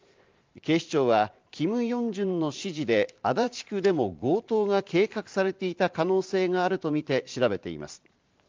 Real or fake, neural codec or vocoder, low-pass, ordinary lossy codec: fake; vocoder, 44.1 kHz, 128 mel bands every 512 samples, BigVGAN v2; 7.2 kHz; Opus, 32 kbps